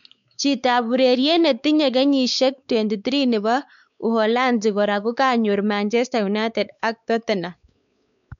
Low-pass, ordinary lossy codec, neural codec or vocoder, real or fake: 7.2 kHz; none; codec, 16 kHz, 4 kbps, X-Codec, WavLM features, trained on Multilingual LibriSpeech; fake